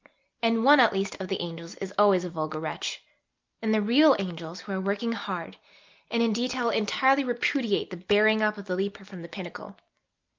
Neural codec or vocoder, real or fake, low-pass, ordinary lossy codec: none; real; 7.2 kHz; Opus, 32 kbps